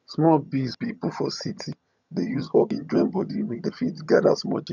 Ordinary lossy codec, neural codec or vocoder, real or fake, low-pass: none; vocoder, 22.05 kHz, 80 mel bands, HiFi-GAN; fake; 7.2 kHz